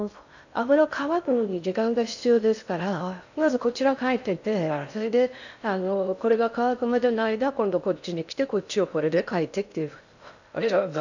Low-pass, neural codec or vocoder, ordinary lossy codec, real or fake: 7.2 kHz; codec, 16 kHz in and 24 kHz out, 0.6 kbps, FocalCodec, streaming, 4096 codes; none; fake